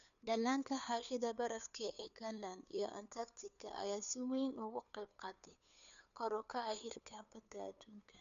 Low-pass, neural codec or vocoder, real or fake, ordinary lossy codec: 7.2 kHz; codec, 16 kHz, 2 kbps, FunCodec, trained on Chinese and English, 25 frames a second; fake; MP3, 96 kbps